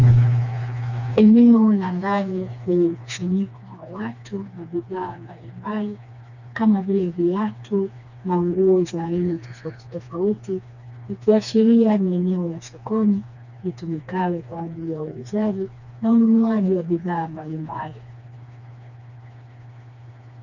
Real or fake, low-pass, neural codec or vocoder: fake; 7.2 kHz; codec, 16 kHz, 2 kbps, FreqCodec, smaller model